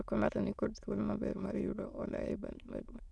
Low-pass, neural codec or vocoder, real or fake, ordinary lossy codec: none; autoencoder, 22.05 kHz, a latent of 192 numbers a frame, VITS, trained on many speakers; fake; none